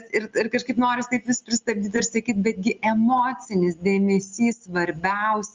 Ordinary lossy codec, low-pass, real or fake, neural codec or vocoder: Opus, 32 kbps; 7.2 kHz; real; none